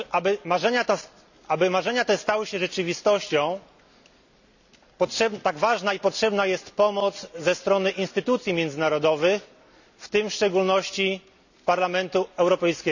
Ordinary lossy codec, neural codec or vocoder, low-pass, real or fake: none; none; 7.2 kHz; real